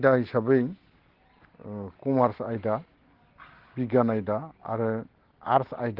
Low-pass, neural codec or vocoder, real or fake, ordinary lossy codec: 5.4 kHz; none; real; Opus, 16 kbps